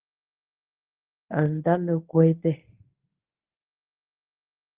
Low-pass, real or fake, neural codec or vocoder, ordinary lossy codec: 3.6 kHz; fake; codec, 24 kHz, 0.9 kbps, WavTokenizer, medium speech release version 1; Opus, 32 kbps